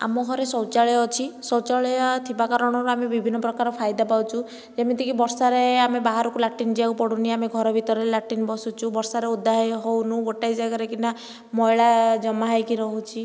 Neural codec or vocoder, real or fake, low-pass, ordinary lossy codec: none; real; none; none